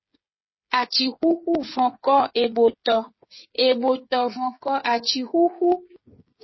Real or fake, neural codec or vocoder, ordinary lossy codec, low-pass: fake; codec, 16 kHz, 8 kbps, FreqCodec, smaller model; MP3, 24 kbps; 7.2 kHz